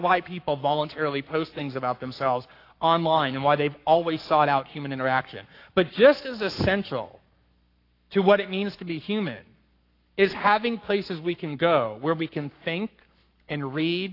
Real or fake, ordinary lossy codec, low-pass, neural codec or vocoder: fake; AAC, 32 kbps; 5.4 kHz; codec, 44.1 kHz, 7.8 kbps, Pupu-Codec